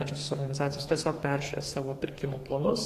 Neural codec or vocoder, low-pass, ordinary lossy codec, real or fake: codec, 32 kHz, 1.9 kbps, SNAC; 14.4 kHz; AAC, 48 kbps; fake